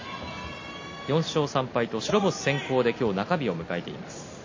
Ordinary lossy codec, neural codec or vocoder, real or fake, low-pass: MP3, 32 kbps; none; real; 7.2 kHz